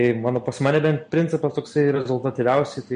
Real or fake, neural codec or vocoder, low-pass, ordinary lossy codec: real; none; 9.9 kHz; MP3, 48 kbps